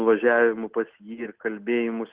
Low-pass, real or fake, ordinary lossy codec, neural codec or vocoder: 3.6 kHz; real; Opus, 16 kbps; none